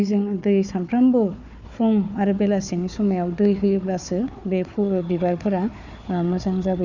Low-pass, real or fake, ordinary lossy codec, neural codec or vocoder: 7.2 kHz; fake; none; codec, 16 kHz, 4 kbps, FunCodec, trained on Chinese and English, 50 frames a second